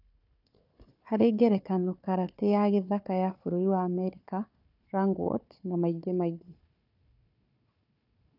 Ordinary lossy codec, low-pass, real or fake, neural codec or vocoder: none; 5.4 kHz; fake; codec, 16 kHz, 4 kbps, FunCodec, trained on Chinese and English, 50 frames a second